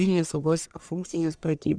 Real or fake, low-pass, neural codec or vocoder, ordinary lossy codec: fake; 9.9 kHz; codec, 44.1 kHz, 1.7 kbps, Pupu-Codec; Opus, 64 kbps